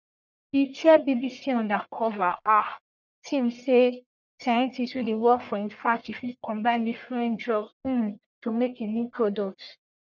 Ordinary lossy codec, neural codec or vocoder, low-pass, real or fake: none; codec, 44.1 kHz, 1.7 kbps, Pupu-Codec; 7.2 kHz; fake